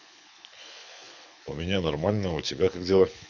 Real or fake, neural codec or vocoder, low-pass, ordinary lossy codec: fake; codec, 24 kHz, 6 kbps, HILCodec; 7.2 kHz; none